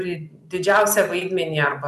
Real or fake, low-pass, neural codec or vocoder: real; 14.4 kHz; none